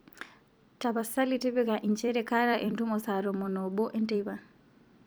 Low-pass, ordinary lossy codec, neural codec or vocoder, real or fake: none; none; vocoder, 44.1 kHz, 128 mel bands every 256 samples, BigVGAN v2; fake